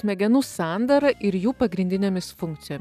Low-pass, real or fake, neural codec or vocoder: 14.4 kHz; real; none